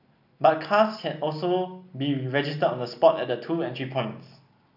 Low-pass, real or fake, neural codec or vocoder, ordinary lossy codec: 5.4 kHz; real; none; none